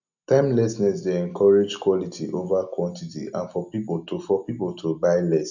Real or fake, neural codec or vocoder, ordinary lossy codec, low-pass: real; none; none; 7.2 kHz